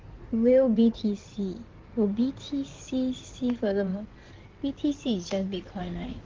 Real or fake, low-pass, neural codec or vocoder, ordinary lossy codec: fake; 7.2 kHz; codec, 16 kHz in and 24 kHz out, 2.2 kbps, FireRedTTS-2 codec; Opus, 16 kbps